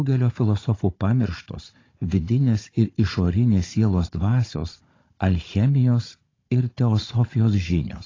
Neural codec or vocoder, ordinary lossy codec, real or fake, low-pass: codec, 16 kHz, 16 kbps, FunCodec, trained on Chinese and English, 50 frames a second; AAC, 32 kbps; fake; 7.2 kHz